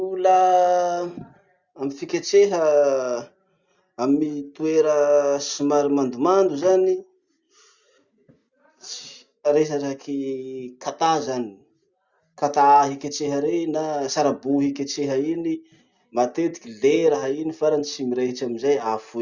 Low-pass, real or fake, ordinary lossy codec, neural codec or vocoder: 7.2 kHz; real; Opus, 64 kbps; none